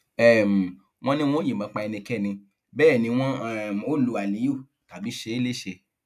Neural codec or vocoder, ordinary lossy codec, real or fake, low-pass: none; none; real; 14.4 kHz